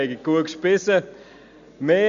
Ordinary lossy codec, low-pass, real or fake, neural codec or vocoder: Opus, 64 kbps; 7.2 kHz; real; none